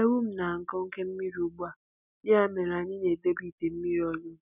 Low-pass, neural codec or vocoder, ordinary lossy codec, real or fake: 3.6 kHz; none; none; real